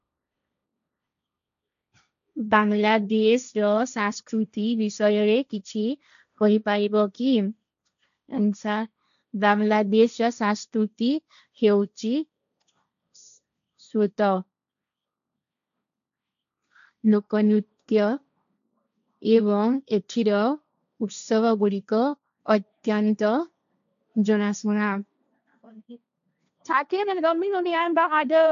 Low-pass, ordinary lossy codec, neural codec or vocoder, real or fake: 7.2 kHz; none; codec, 16 kHz, 1.1 kbps, Voila-Tokenizer; fake